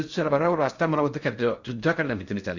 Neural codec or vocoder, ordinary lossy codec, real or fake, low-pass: codec, 16 kHz in and 24 kHz out, 0.6 kbps, FocalCodec, streaming, 4096 codes; Opus, 64 kbps; fake; 7.2 kHz